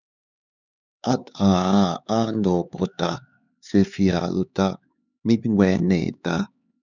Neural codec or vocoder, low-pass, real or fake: codec, 16 kHz, 4 kbps, X-Codec, HuBERT features, trained on LibriSpeech; 7.2 kHz; fake